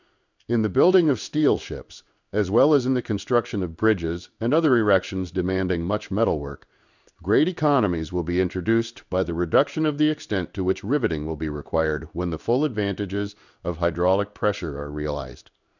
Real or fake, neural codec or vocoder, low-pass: fake; codec, 16 kHz in and 24 kHz out, 1 kbps, XY-Tokenizer; 7.2 kHz